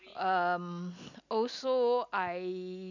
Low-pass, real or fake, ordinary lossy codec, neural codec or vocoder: 7.2 kHz; real; none; none